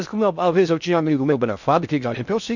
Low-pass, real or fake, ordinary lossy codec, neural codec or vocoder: 7.2 kHz; fake; none; codec, 16 kHz in and 24 kHz out, 0.6 kbps, FocalCodec, streaming, 4096 codes